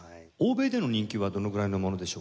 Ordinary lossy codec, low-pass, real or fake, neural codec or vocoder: none; none; real; none